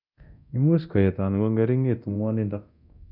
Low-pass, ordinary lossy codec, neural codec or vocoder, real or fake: 5.4 kHz; none; codec, 24 kHz, 0.9 kbps, DualCodec; fake